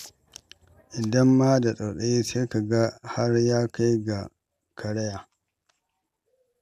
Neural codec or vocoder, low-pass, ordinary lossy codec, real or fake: none; 14.4 kHz; none; real